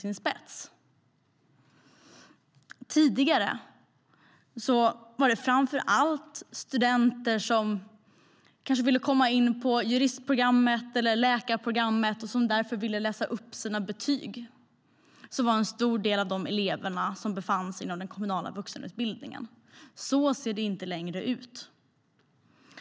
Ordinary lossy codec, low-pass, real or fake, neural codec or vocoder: none; none; real; none